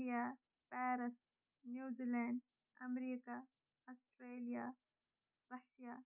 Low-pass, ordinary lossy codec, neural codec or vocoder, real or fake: 3.6 kHz; none; none; real